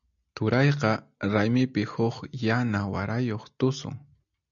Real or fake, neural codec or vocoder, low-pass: real; none; 7.2 kHz